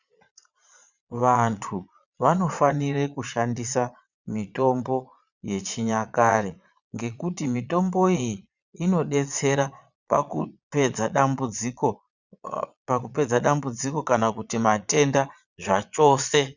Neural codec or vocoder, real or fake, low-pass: vocoder, 22.05 kHz, 80 mel bands, Vocos; fake; 7.2 kHz